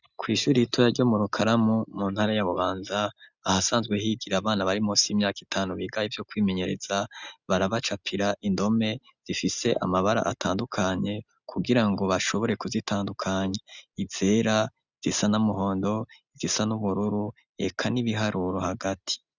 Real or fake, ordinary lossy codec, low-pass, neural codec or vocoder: real; Opus, 64 kbps; 7.2 kHz; none